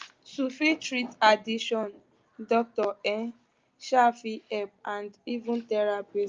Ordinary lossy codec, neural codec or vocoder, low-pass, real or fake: Opus, 24 kbps; none; 7.2 kHz; real